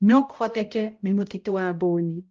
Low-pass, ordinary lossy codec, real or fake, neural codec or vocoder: 7.2 kHz; Opus, 24 kbps; fake; codec, 16 kHz, 0.5 kbps, X-Codec, HuBERT features, trained on balanced general audio